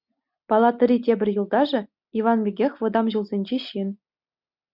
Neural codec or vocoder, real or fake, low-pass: none; real; 5.4 kHz